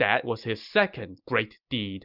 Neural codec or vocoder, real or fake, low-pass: none; real; 5.4 kHz